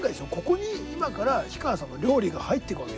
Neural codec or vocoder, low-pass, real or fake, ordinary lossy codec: none; none; real; none